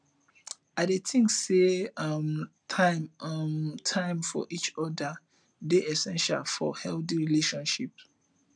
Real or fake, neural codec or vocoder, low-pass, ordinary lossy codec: real; none; 9.9 kHz; none